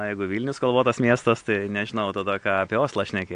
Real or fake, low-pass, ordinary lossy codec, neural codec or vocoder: real; 9.9 kHz; AAC, 64 kbps; none